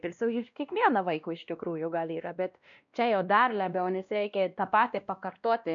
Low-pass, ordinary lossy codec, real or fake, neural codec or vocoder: 7.2 kHz; MP3, 96 kbps; fake; codec, 16 kHz, 1 kbps, X-Codec, WavLM features, trained on Multilingual LibriSpeech